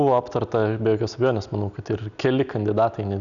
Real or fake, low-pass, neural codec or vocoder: real; 7.2 kHz; none